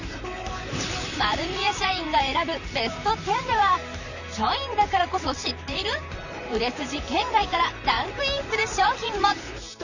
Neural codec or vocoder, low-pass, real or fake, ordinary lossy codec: vocoder, 44.1 kHz, 128 mel bands, Pupu-Vocoder; 7.2 kHz; fake; none